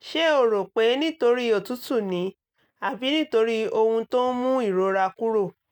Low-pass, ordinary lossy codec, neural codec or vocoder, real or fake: 19.8 kHz; none; none; real